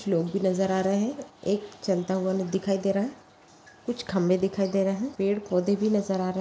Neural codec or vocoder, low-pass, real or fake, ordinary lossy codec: none; none; real; none